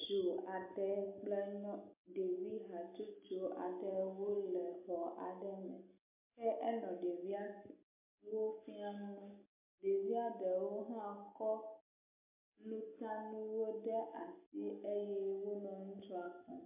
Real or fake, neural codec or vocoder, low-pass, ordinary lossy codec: real; none; 3.6 kHz; MP3, 24 kbps